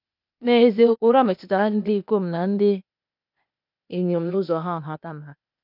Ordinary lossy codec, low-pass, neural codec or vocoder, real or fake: none; 5.4 kHz; codec, 16 kHz, 0.8 kbps, ZipCodec; fake